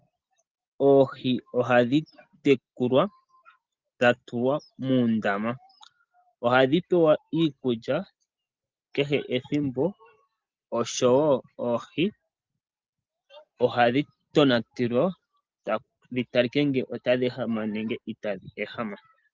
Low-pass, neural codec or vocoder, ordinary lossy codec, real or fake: 7.2 kHz; none; Opus, 32 kbps; real